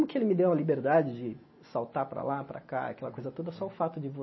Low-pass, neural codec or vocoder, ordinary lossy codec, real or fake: 7.2 kHz; none; MP3, 24 kbps; real